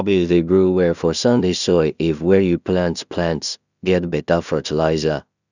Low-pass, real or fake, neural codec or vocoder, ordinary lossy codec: 7.2 kHz; fake; codec, 16 kHz in and 24 kHz out, 0.4 kbps, LongCat-Audio-Codec, two codebook decoder; none